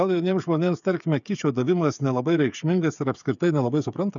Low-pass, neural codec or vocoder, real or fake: 7.2 kHz; codec, 16 kHz, 8 kbps, FreqCodec, smaller model; fake